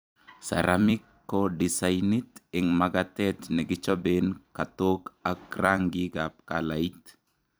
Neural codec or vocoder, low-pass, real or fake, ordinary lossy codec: vocoder, 44.1 kHz, 128 mel bands every 256 samples, BigVGAN v2; none; fake; none